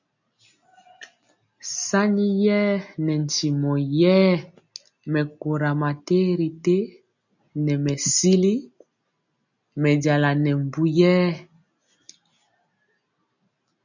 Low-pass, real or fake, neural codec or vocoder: 7.2 kHz; real; none